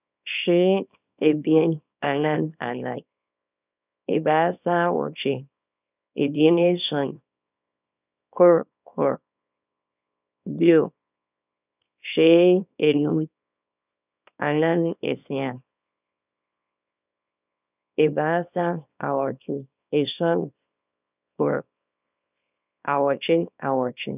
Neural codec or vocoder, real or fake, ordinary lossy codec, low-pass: codec, 24 kHz, 0.9 kbps, WavTokenizer, small release; fake; none; 3.6 kHz